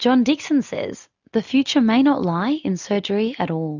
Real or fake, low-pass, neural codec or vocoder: real; 7.2 kHz; none